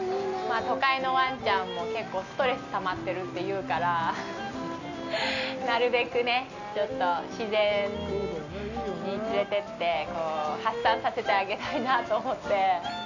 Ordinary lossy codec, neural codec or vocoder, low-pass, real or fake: none; none; 7.2 kHz; real